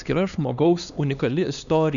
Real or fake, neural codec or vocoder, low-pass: fake; codec, 16 kHz, 2 kbps, X-Codec, HuBERT features, trained on LibriSpeech; 7.2 kHz